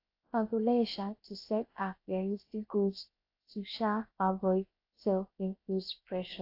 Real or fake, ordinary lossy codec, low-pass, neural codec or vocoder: fake; AAC, 32 kbps; 5.4 kHz; codec, 16 kHz, 0.7 kbps, FocalCodec